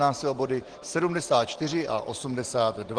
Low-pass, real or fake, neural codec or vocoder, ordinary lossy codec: 9.9 kHz; real; none; Opus, 16 kbps